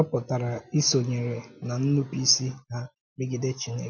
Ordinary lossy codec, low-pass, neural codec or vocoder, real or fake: none; 7.2 kHz; none; real